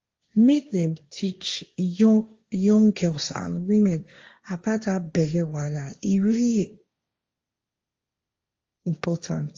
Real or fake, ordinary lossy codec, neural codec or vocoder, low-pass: fake; Opus, 32 kbps; codec, 16 kHz, 1.1 kbps, Voila-Tokenizer; 7.2 kHz